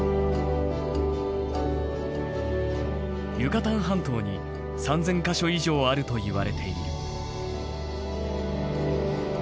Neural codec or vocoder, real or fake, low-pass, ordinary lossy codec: none; real; none; none